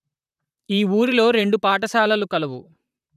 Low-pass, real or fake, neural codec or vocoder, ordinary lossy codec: 14.4 kHz; fake; vocoder, 44.1 kHz, 128 mel bands, Pupu-Vocoder; none